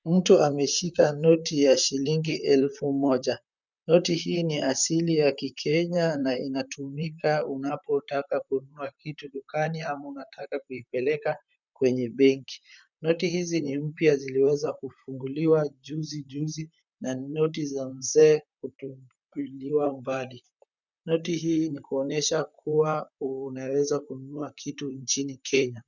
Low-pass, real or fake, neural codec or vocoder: 7.2 kHz; fake; vocoder, 44.1 kHz, 128 mel bands, Pupu-Vocoder